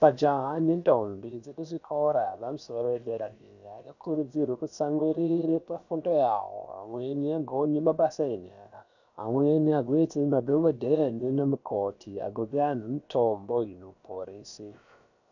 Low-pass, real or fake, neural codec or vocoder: 7.2 kHz; fake; codec, 16 kHz, about 1 kbps, DyCAST, with the encoder's durations